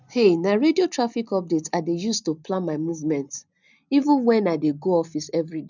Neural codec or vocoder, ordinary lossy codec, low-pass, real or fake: none; none; 7.2 kHz; real